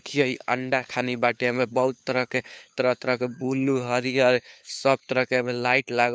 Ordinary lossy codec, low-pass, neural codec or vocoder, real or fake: none; none; codec, 16 kHz, 4 kbps, FunCodec, trained on LibriTTS, 50 frames a second; fake